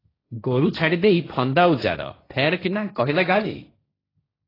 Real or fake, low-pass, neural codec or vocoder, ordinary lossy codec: fake; 5.4 kHz; codec, 16 kHz, 1.1 kbps, Voila-Tokenizer; AAC, 24 kbps